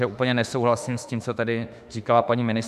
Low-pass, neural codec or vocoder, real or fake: 14.4 kHz; autoencoder, 48 kHz, 32 numbers a frame, DAC-VAE, trained on Japanese speech; fake